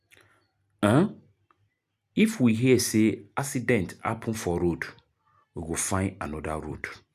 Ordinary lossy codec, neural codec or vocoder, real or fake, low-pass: none; none; real; 14.4 kHz